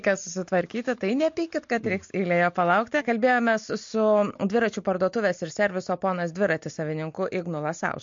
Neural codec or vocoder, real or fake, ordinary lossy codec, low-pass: none; real; MP3, 48 kbps; 7.2 kHz